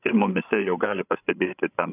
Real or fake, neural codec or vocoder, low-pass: fake; codec, 16 kHz, 16 kbps, FreqCodec, larger model; 3.6 kHz